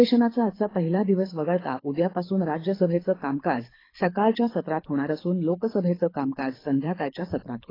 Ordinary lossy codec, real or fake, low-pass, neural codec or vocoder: AAC, 24 kbps; fake; 5.4 kHz; codec, 24 kHz, 6 kbps, HILCodec